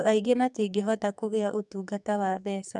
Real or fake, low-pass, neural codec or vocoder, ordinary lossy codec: fake; 10.8 kHz; codec, 44.1 kHz, 2.6 kbps, SNAC; none